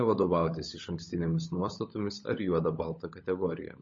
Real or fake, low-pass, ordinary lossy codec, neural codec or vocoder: fake; 7.2 kHz; MP3, 32 kbps; codec, 16 kHz, 16 kbps, FunCodec, trained on LibriTTS, 50 frames a second